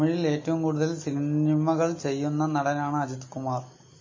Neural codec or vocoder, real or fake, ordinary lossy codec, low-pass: none; real; MP3, 32 kbps; 7.2 kHz